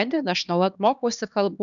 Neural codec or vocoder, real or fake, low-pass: codec, 16 kHz, 2 kbps, X-Codec, HuBERT features, trained on LibriSpeech; fake; 7.2 kHz